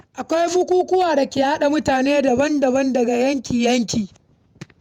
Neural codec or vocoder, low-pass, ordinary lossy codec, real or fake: vocoder, 48 kHz, 128 mel bands, Vocos; 19.8 kHz; none; fake